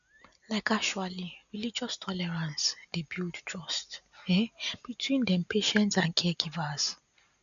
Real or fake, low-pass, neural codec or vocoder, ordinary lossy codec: real; 7.2 kHz; none; none